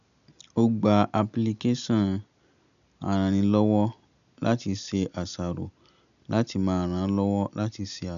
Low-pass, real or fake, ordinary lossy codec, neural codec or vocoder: 7.2 kHz; real; none; none